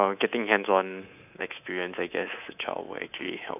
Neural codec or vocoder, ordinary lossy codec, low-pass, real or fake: codec, 24 kHz, 3.1 kbps, DualCodec; none; 3.6 kHz; fake